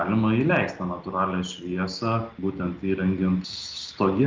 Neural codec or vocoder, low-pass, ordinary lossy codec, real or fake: none; 7.2 kHz; Opus, 32 kbps; real